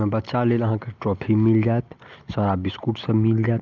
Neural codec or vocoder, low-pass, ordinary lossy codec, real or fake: none; 7.2 kHz; Opus, 32 kbps; real